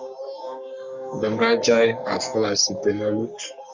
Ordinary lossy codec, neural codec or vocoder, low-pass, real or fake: Opus, 64 kbps; codec, 44.1 kHz, 3.4 kbps, Pupu-Codec; 7.2 kHz; fake